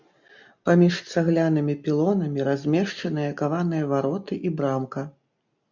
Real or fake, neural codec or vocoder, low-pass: real; none; 7.2 kHz